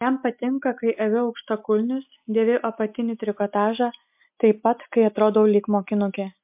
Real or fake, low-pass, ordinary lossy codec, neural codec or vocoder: real; 3.6 kHz; MP3, 32 kbps; none